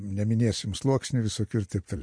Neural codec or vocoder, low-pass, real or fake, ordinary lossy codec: none; 9.9 kHz; real; MP3, 48 kbps